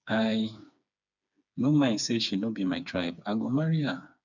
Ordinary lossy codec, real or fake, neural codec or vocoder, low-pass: none; fake; codec, 16 kHz, 4 kbps, FreqCodec, smaller model; 7.2 kHz